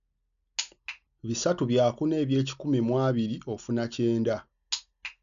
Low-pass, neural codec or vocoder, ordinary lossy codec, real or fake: 7.2 kHz; none; none; real